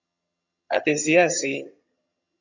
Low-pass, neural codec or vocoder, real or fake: 7.2 kHz; vocoder, 22.05 kHz, 80 mel bands, HiFi-GAN; fake